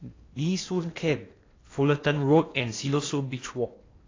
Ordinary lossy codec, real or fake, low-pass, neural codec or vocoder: AAC, 32 kbps; fake; 7.2 kHz; codec, 16 kHz in and 24 kHz out, 0.8 kbps, FocalCodec, streaming, 65536 codes